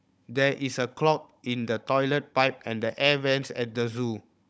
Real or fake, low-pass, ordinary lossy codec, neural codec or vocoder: fake; none; none; codec, 16 kHz, 16 kbps, FunCodec, trained on Chinese and English, 50 frames a second